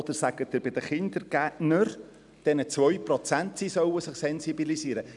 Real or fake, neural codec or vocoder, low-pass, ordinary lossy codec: real; none; 10.8 kHz; none